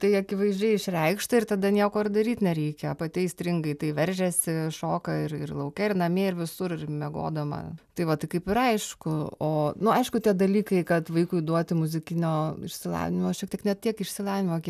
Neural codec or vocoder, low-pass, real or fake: none; 14.4 kHz; real